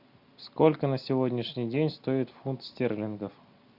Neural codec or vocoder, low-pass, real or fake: none; 5.4 kHz; real